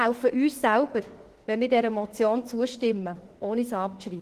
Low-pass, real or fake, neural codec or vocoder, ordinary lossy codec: 14.4 kHz; fake; autoencoder, 48 kHz, 32 numbers a frame, DAC-VAE, trained on Japanese speech; Opus, 16 kbps